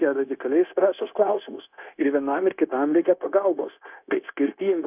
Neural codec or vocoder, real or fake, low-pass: codec, 16 kHz, 0.9 kbps, LongCat-Audio-Codec; fake; 3.6 kHz